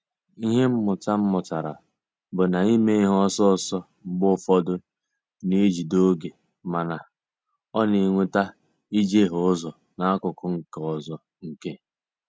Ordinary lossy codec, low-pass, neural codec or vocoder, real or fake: none; none; none; real